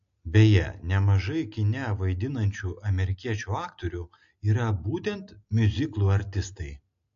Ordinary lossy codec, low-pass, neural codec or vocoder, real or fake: AAC, 48 kbps; 7.2 kHz; none; real